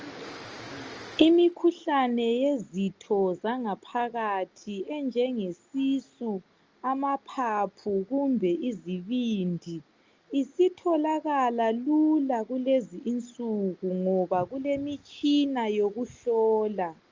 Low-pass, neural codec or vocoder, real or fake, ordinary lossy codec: 7.2 kHz; none; real; Opus, 24 kbps